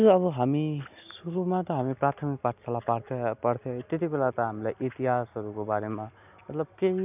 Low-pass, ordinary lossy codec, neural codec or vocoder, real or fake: 3.6 kHz; none; none; real